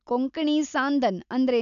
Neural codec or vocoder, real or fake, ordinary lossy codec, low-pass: none; real; none; 7.2 kHz